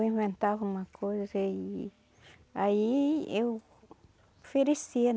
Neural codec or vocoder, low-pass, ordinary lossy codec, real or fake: none; none; none; real